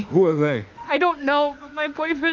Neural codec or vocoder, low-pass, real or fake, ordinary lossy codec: autoencoder, 48 kHz, 32 numbers a frame, DAC-VAE, trained on Japanese speech; 7.2 kHz; fake; Opus, 24 kbps